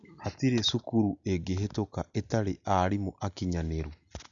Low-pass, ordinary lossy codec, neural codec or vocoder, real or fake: 7.2 kHz; MP3, 96 kbps; none; real